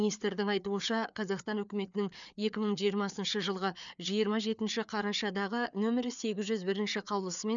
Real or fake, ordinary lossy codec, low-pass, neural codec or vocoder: fake; none; 7.2 kHz; codec, 16 kHz, 4 kbps, FreqCodec, larger model